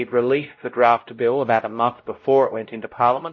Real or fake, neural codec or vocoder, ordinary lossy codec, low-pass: fake; codec, 16 kHz, 0.5 kbps, X-Codec, WavLM features, trained on Multilingual LibriSpeech; MP3, 32 kbps; 7.2 kHz